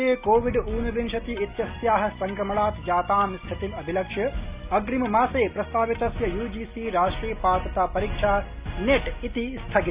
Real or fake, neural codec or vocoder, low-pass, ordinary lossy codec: real; none; 3.6 kHz; Opus, 64 kbps